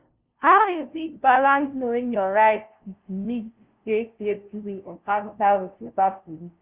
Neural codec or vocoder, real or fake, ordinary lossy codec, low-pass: codec, 16 kHz, 0.5 kbps, FunCodec, trained on LibriTTS, 25 frames a second; fake; Opus, 32 kbps; 3.6 kHz